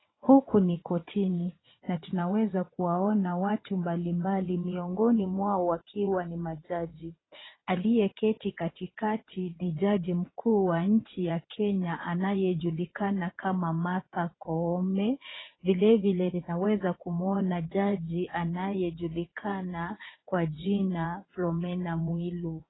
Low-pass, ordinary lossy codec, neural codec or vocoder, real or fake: 7.2 kHz; AAC, 16 kbps; vocoder, 22.05 kHz, 80 mel bands, WaveNeXt; fake